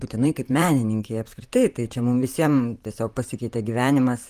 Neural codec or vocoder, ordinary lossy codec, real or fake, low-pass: vocoder, 44.1 kHz, 128 mel bands, Pupu-Vocoder; Opus, 32 kbps; fake; 14.4 kHz